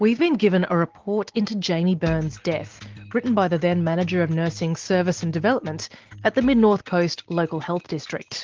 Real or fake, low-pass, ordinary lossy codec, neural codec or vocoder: real; 7.2 kHz; Opus, 16 kbps; none